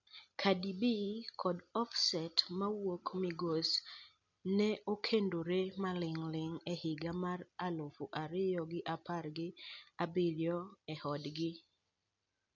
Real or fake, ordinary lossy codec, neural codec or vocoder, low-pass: real; none; none; 7.2 kHz